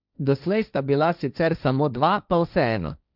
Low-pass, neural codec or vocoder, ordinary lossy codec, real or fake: 5.4 kHz; codec, 16 kHz, 1.1 kbps, Voila-Tokenizer; none; fake